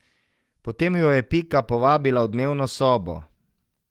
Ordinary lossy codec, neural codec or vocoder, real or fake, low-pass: Opus, 24 kbps; codec, 44.1 kHz, 7.8 kbps, DAC; fake; 19.8 kHz